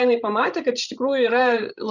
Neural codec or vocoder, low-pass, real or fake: codec, 16 kHz, 16 kbps, FreqCodec, larger model; 7.2 kHz; fake